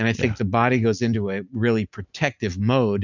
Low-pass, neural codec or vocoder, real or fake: 7.2 kHz; none; real